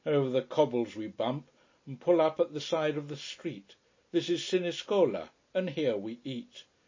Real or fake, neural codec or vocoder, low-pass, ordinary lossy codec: real; none; 7.2 kHz; MP3, 32 kbps